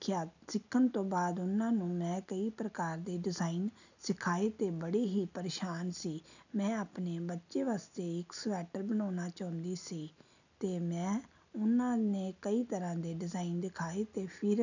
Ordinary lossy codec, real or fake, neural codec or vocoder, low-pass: none; real; none; 7.2 kHz